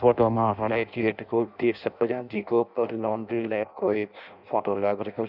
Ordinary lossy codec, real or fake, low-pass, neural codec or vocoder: none; fake; 5.4 kHz; codec, 16 kHz in and 24 kHz out, 0.6 kbps, FireRedTTS-2 codec